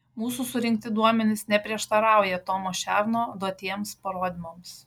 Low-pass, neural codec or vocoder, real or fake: 19.8 kHz; none; real